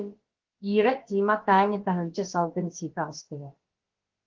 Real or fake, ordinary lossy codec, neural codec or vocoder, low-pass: fake; Opus, 16 kbps; codec, 16 kHz, about 1 kbps, DyCAST, with the encoder's durations; 7.2 kHz